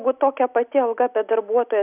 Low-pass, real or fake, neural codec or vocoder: 3.6 kHz; real; none